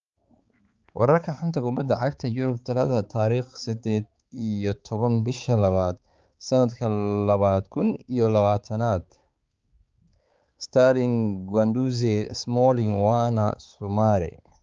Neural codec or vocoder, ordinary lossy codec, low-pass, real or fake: codec, 16 kHz, 4 kbps, X-Codec, HuBERT features, trained on balanced general audio; Opus, 24 kbps; 7.2 kHz; fake